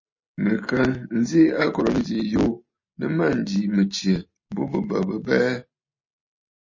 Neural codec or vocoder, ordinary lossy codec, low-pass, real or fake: none; MP3, 32 kbps; 7.2 kHz; real